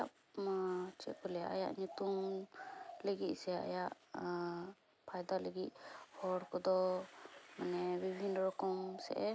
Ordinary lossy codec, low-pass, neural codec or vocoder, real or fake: none; none; none; real